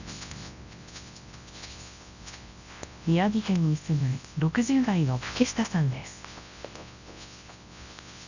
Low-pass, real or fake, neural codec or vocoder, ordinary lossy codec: 7.2 kHz; fake; codec, 24 kHz, 0.9 kbps, WavTokenizer, large speech release; none